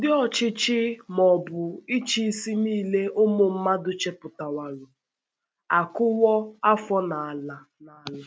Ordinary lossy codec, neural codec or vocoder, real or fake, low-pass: none; none; real; none